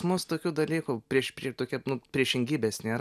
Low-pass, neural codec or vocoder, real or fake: 14.4 kHz; none; real